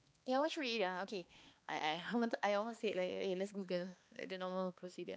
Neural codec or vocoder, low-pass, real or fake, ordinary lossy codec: codec, 16 kHz, 2 kbps, X-Codec, HuBERT features, trained on balanced general audio; none; fake; none